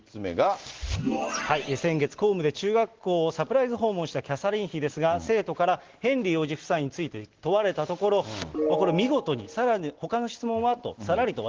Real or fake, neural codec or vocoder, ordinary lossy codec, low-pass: real; none; Opus, 16 kbps; 7.2 kHz